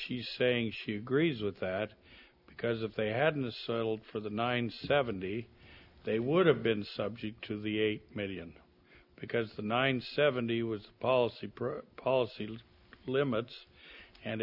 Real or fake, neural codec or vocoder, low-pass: real; none; 5.4 kHz